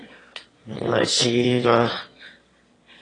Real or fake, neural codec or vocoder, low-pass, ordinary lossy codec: fake; autoencoder, 22.05 kHz, a latent of 192 numbers a frame, VITS, trained on one speaker; 9.9 kHz; AAC, 32 kbps